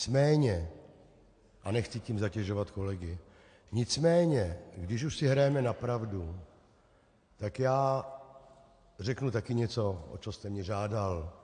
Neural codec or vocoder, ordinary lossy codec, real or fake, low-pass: none; AAC, 48 kbps; real; 9.9 kHz